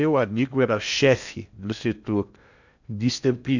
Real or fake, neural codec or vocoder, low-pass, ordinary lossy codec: fake; codec, 16 kHz in and 24 kHz out, 0.6 kbps, FocalCodec, streaming, 2048 codes; 7.2 kHz; none